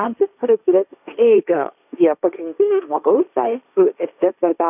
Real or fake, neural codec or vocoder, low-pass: fake; codec, 16 kHz, 1.1 kbps, Voila-Tokenizer; 3.6 kHz